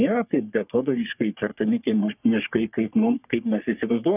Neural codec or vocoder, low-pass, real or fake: codec, 44.1 kHz, 3.4 kbps, Pupu-Codec; 3.6 kHz; fake